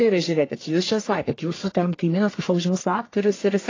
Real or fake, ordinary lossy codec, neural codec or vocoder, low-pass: fake; AAC, 32 kbps; codec, 44.1 kHz, 1.7 kbps, Pupu-Codec; 7.2 kHz